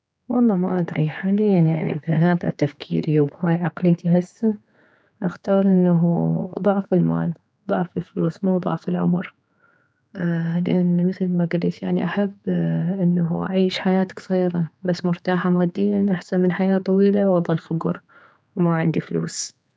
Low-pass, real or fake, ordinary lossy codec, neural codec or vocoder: none; fake; none; codec, 16 kHz, 4 kbps, X-Codec, HuBERT features, trained on general audio